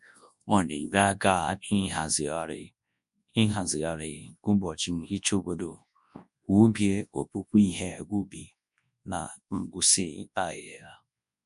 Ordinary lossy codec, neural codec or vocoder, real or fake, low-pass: MP3, 64 kbps; codec, 24 kHz, 0.9 kbps, WavTokenizer, large speech release; fake; 10.8 kHz